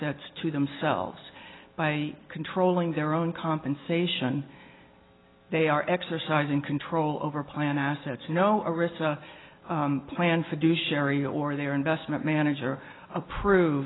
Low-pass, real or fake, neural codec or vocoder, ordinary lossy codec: 7.2 kHz; real; none; AAC, 16 kbps